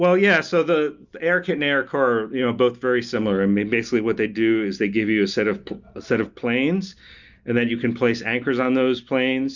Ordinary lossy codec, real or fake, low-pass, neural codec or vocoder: Opus, 64 kbps; real; 7.2 kHz; none